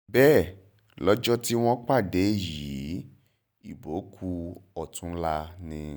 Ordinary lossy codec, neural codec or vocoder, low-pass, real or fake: none; none; none; real